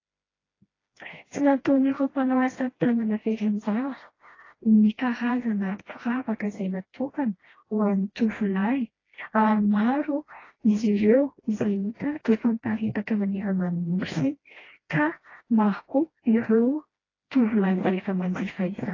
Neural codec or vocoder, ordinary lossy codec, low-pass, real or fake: codec, 16 kHz, 1 kbps, FreqCodec, smaller model; AAC, 32 kbps; 7.2 kHz; fake